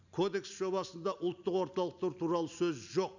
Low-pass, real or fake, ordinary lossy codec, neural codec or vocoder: 7.2 kHz; real; none; none